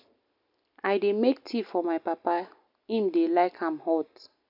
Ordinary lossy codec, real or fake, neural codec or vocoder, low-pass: none; real; none; 5.4 kHz